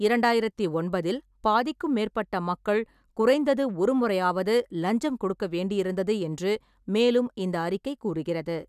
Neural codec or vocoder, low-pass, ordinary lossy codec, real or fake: none; 14.4 kHz; none; real